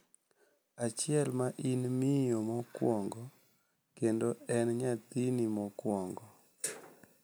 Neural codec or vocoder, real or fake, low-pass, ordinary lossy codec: none; real; none; none